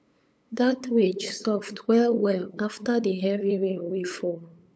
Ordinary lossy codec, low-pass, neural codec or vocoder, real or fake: none; none; codec, 16 kHz, 8 kbps, FunCodec, trained on LibriTTS, 25 frames a second; fake